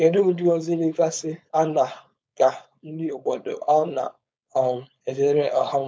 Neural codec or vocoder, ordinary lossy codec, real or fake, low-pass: codec, 16 kHz, 4.8 kbps, FACodec; none; fake; none